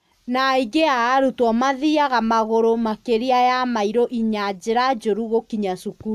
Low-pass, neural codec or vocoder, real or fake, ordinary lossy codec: 14.4 kHz; none; real; AAC, 96 kbps